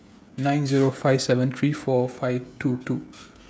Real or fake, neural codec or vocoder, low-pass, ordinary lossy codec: fake; codec, 16 kHz, 16 kbps, FreqCodec, smaller model; none; none